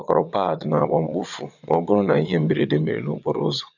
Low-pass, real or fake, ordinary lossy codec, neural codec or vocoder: 7.2 kHz; fake; none; vocoder, 22.05 kHz, 80 mel bands, Vocos